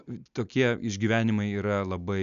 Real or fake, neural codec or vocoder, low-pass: real; none; 7.2 kHz